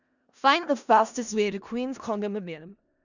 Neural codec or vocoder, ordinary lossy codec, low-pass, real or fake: codec, 16 kHz in and 24 kHz out, 0.4 kbps, LongCat-Audio-Codec, four codebook decoder; none; 7.2 kHz; fake